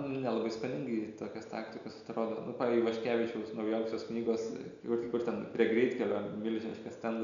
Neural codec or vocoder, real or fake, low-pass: none; real; 7.2 kHz